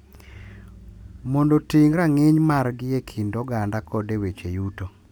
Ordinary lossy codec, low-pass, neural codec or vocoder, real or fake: none; 19.8 kHz; none; real